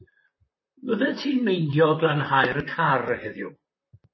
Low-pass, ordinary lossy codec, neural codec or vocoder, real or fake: 7.2 kHz; MP3, 24 kbps; vocoder, 44.1 kHz, 128 mel bands, Pupu-Vocoder; fake